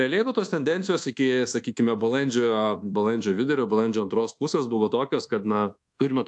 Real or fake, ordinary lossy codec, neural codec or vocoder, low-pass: fake; AAC, 64 kbps; codec, 24 kHz, 1.2 kbps, DualCodec; 10.8 kHz